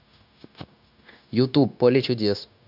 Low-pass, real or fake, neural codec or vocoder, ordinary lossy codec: 5.4 kHz; fake; codec, 16 kHz, 0.9 kbps, LongCat-Audio-Codec; none